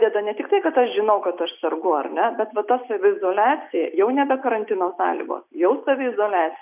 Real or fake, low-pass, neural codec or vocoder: real; 3.6 kHz; none